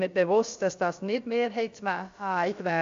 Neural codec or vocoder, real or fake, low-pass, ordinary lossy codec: codec, 16 kHz, about 1 kbps, DyCAST, with the encoder's durations; fake; 7.2 kHz; AAC, 48 kbps